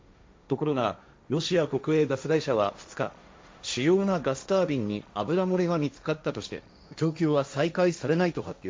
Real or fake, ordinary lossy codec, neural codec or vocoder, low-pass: fake; none; codec, 16 kHz, 1.1 kbps, Voila-Tokenizer; none